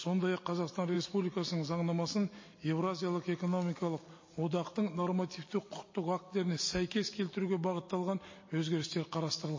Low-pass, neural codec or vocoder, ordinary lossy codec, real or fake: 7.2 kHz; none; MP3, 32 kbps; real